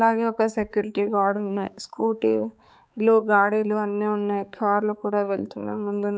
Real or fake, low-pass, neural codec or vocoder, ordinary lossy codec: fake; none; codec, 16 kHz, 4 kbps, X-Codec, HuBERT features, trained on balanced general audio; none